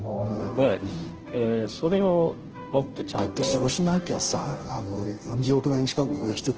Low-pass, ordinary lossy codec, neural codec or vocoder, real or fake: 7.2 kHz; Opus, 16 kbps; codec, 16 kHz, 0.5 kbps, FunCodec, trained on Chinese and English, 25 frames a second; fake